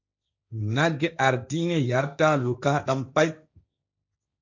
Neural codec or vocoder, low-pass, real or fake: codec, 16 kHz, 1.1 kbps, Voila-Tokenizer; 7.2 kHz; fake